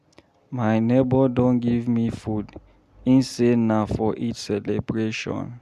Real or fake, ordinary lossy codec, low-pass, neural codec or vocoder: real; none; 14.4 kHz; none